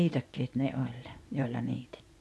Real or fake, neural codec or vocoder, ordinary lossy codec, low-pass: fake; vocoder, 24 kHz, 100 mel bands, Vocos; none; none